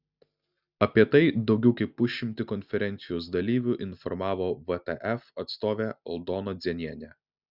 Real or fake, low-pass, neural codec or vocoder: real; 5.4 kHz; none